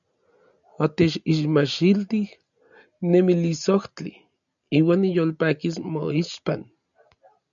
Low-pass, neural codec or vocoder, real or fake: 7.2 kHz; none; real